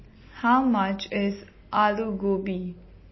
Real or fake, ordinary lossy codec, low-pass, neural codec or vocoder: real; MP3, 24 kbps; 7.2 kHz; none